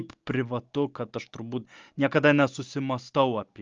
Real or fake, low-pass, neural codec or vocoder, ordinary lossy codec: real; 7.2 kHz; none; Opus, 24 kbps